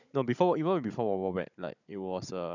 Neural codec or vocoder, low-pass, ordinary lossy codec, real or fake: codec, 16 kHz, 16 kbps, FunCodec, trained on Chinese and English, 50 frames a second; 7.2 kHz; none; fake